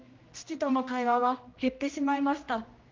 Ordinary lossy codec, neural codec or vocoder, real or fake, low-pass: Opus, 24 kbps; codec, 16 kHz, 1 kbps, X-Codec, HuBERT features, trained on general audio; fake; 7.2 kHz